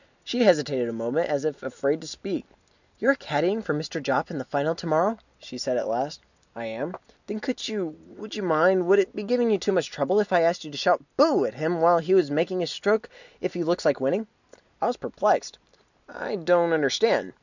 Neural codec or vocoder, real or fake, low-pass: none; real; 7.2 kHz